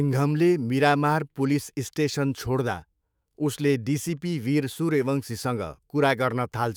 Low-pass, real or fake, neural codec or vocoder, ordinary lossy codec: none; fake; autoencoder, 48 kHz, 128 numbers a frame, DAC-VAE, trained on Japanese speech; none